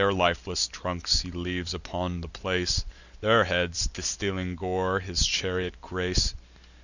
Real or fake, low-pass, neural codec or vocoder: real; 7.2 kHz; none